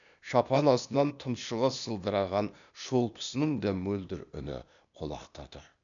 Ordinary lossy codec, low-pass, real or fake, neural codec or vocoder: none; 7.2 kHz; fake; codec, 16 kHz, 0.8 kbps, ZipCodec